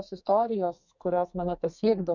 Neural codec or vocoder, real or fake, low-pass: codec, 32 kHz, 1.9 kbps, SNAC; fake; 7.2 kHz